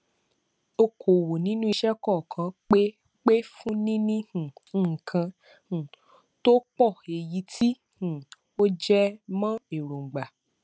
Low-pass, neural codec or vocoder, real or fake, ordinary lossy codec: none; none; real; none